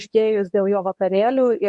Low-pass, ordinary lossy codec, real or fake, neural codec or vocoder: 10.8 kHz; MP3, 64 kbps; fake; codec, 24 kHz, 0.9 kbps, WavTokenizer, medium speech release version 2